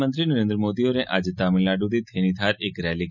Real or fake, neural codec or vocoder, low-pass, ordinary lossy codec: real; none; 7.2 kHz; none